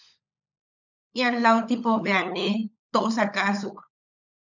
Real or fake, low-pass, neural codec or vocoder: fake; 7.2 kHz; codec, 16 kHz, 16 kbps, FunCodec, trained on LibriTTS, 50 frames a second